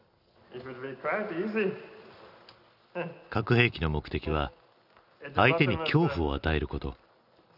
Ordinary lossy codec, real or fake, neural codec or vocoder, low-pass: none; real; none; 5.4 kHz